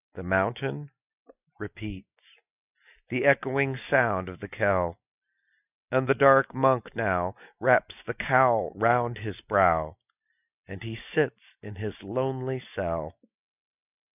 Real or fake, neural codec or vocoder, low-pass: real; none; 3.6 kHz